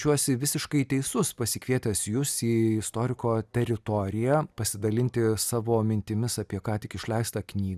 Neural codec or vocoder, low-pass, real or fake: none; 14.4 kHz; real